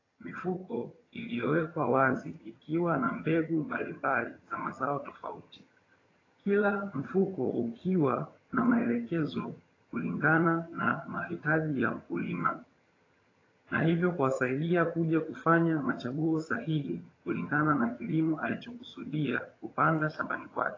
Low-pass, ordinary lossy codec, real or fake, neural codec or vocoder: 7.2 kHz; AAC, 32 kbps; fake; vocoder, 22.05 kHz, 80 mel bands, HiFi-GAN